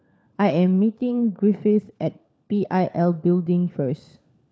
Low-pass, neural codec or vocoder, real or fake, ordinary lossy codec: none; codec, 16 kHz, 4 kbps, FunCodec, trained on LibriTTS, 50 frames a second; fake; none